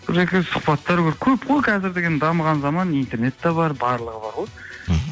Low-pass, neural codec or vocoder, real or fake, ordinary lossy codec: none; none; real; none